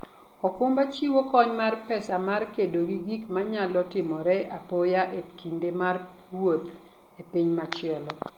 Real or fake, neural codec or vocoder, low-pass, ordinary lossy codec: real; none; 19.8 kHz; Opus, 24 kbps